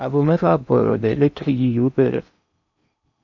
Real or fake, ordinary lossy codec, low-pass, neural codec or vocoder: fake; none; 7.2 kHz; codec, 16 kHz in and 24 kHz out, 0.8 kbps, FocalCodec, streaming, 65536 codes